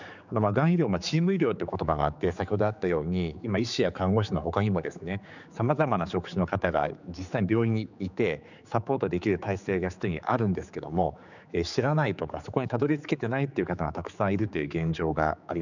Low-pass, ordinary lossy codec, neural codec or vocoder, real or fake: 7.2 kHz; none; codec, 16 kHz, 4 kbps, X-Codec, HuBERT features, trained on general audio; fake